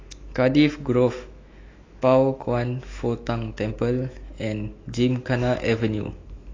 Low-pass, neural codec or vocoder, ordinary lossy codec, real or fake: 7.2 kHz; autoencoder, 48 kHz, 128 numbers a frame, DAC-VAE, trained on Japanese speech; AAC, 32 kbps; fake